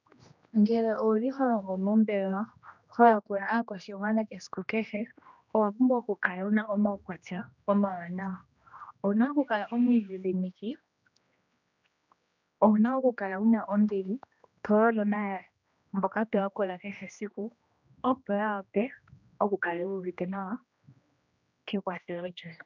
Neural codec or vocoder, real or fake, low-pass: codec, 16 kHz, 1 kbps, X-Codec, HuBERT features, trained on general audio; fake; 7.2 kHz